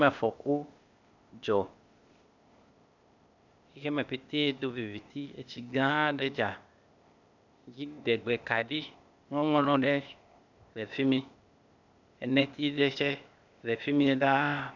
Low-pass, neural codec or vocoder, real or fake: 7.2 kHz; codec, 16 kHz, 0.8 kbps, ZipCodec; fake